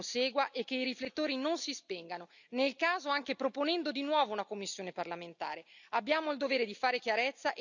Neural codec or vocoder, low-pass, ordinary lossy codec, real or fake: none; 7.2 kHz; none; real